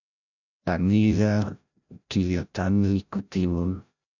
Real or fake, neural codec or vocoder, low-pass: fake; codec, 16 kHz, 0.5 kbps, FreqCodec, larger model; 7.2 kHz